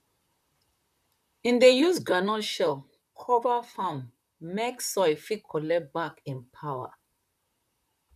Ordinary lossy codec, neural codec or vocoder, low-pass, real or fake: none; vocoder, 44.1 kHz, 128 mel bands, Pupu-Vocoder; 14.4 kHz; fake